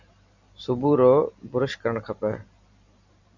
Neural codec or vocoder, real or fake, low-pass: vocoder, 44.1 kHz, 128 mel bands every 256 samples, BigVGAN v2; fake; 7.2 kHz